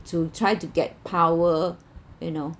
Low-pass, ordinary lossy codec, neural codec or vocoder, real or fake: none; none; none; real